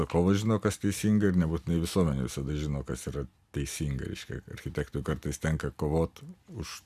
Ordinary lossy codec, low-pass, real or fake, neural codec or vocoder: AAC, 96 kbps; 14.4 kHz; real; none